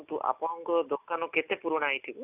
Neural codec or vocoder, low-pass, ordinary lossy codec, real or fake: none; 3.6 kHz; none; real